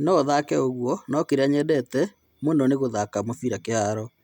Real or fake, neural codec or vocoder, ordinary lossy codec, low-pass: real; none; none; 19.8 kHz